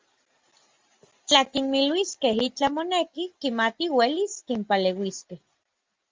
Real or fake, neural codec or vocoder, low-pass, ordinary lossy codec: real; none; 7.2 kHz; Opus, 32 kbps